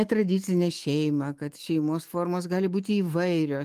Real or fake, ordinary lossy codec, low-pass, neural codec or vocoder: fake; Opus, 24 kbps; 14.4 kHz; autoencoder, 48 kHz, 128 numbers a frame, DAC-VAE, trained on Japanese speech